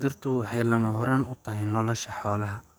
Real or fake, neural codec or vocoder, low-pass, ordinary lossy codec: fake; codec, 44.1 kHz, 2.6 kbps, SNAC; none; none